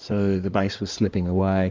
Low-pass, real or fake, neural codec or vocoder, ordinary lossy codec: 7.2 kHz; fake; codec, 16 kHz in and 24 kHz out, 2.2 kbps, FireRedTTS-2 codec; Opus, 32 kbps